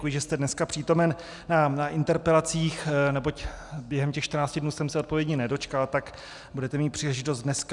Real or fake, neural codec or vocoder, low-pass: real; none; 10.8 kHz